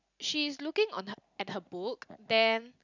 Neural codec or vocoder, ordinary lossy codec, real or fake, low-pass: none; none; real; 7.2 kHz